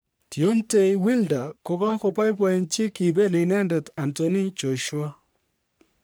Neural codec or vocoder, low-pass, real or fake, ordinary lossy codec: codec, 44.1 kHz, 3.4 kbps, Pupu-Codec; none; fake; none